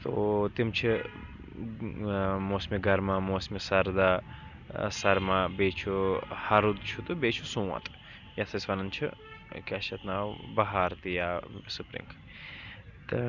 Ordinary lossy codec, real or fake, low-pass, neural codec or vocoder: none; real; 7.2 kHz; none